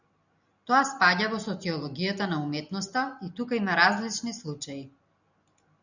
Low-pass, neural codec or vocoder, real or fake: 7.2 kHz; none; real